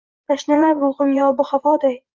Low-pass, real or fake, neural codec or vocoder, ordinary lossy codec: 7.2 kHz; fake; vocoder, 44.1 kHz, 80 mel bands, Vocos; Opus, 24 kbps